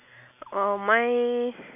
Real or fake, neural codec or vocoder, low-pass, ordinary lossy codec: real; none; 3.6 kHz; none